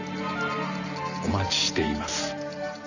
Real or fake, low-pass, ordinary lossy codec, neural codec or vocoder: fake; 7.2 kHz; none; vocoder, 44.1 kHz, 128 mel bands every 512 samples, BigVGAN v2